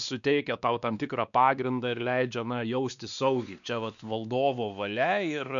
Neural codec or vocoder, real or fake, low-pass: codec, 16 kHz, 2 kbps, X-Codec, WavLM features, trained on Multilingual LibriSpeech; fake; 7.2 kHz